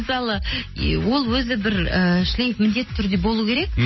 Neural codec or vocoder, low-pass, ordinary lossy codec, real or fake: none; 7.2 kHz; MP3, 24 kbps; real